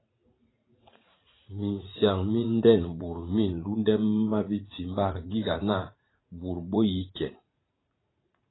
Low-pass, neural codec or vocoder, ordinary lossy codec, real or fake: 7.2 kHz; vocoder, 44.1 kHz, 80 mel bands, Vocos; AAC, 16 kbps; fake